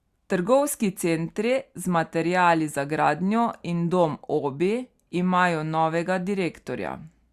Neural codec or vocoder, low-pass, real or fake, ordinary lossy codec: none; 14.4 kHz; real; Opus, 64 kbps